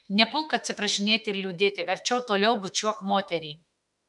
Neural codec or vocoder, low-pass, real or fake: autoencoder, 48 kHz, 32 numbers a frame, DAC-VAE, trained on Japanese speech; 10.8 kHz; fake